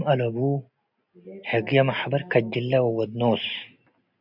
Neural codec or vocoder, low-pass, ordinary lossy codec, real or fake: none; 5.4 kHz; AAC, 48 kbps; real